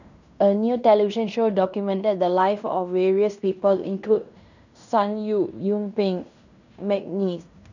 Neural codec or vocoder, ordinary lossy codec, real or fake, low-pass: codec, 16 kHz in and 24 kHz out, 0.9 kbps, LongCat-Audio-Codec, fine tuned four codebook decoder; none; fake; 7.2 kHz